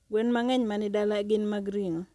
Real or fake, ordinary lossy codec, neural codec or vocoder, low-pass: real; none; none; none